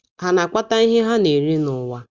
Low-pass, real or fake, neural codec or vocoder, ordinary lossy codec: 7.2 kHz; real; none; Opus, 32 kbps